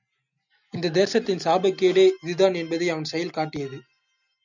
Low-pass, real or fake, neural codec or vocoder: 7.2 kHz; real; none